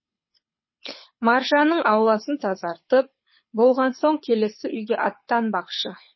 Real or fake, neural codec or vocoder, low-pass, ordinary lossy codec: fake; codec, 24 kHz, 6 kbps, HILCodec; 7.2 kHz; MP3, 24 kbps